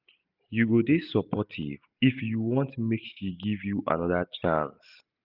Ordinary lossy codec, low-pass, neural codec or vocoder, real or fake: none; 5.4 kHz; none; real